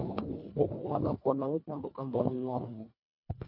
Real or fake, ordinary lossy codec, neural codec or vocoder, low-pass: fake; MP3, 32 kbps; codec, 24 kHz, 1.5 kbps, HILCodec; 5.4 kHz